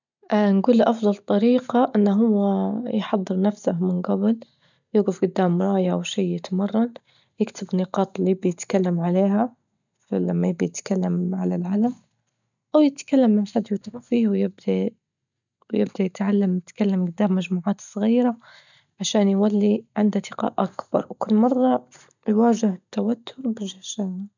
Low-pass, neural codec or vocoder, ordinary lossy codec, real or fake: 7.2 kHz; none; none; real